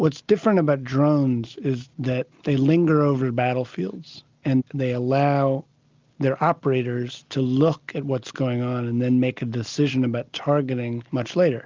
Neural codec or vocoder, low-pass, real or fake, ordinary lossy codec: none; 7.2 kHz; real; Opus, 24 kbps